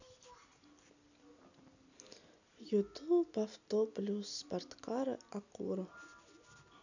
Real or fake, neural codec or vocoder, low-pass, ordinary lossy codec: real; none; 7.2 kHz; none